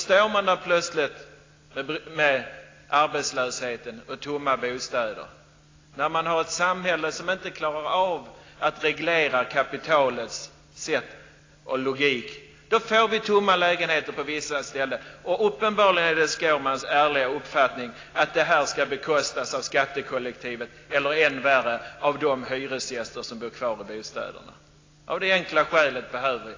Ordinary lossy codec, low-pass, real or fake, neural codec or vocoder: AAC, 32 kbps; 7.2 kHz; real; none